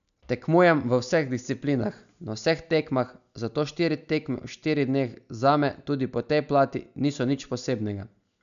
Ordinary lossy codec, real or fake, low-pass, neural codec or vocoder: none; real; 7.2 kHz; none